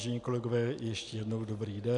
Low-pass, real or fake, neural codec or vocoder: 10.8 kHz; real; none